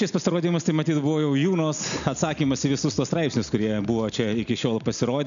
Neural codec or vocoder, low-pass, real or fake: none; 7.2 kHz; real